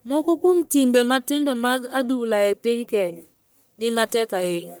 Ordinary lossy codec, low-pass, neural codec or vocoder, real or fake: none; none; codec, 44.1 kHz, 1.7 kbps, Pupu-Codec; fake